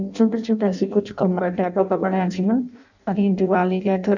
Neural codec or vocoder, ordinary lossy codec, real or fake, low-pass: codec, 16 kHz in and 24 kHz out, 0.6 kbps, FireRedTTS-2 codec; none; fake; 7.2 kHz